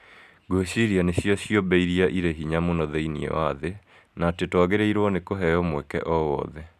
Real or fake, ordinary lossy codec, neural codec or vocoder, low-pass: real; none; none; 14.4 kHz